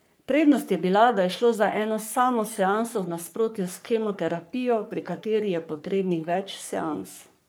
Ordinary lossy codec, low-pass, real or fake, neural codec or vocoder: none; none; fake; codec, 44.1 kHz, 3.4 kbps, Pupu-Codec